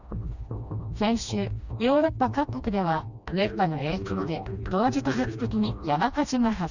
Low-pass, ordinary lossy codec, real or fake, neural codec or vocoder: 7.2 kHz; none; fake; codec, 16 kHz, 1 kbps, FreqCodec, smaller model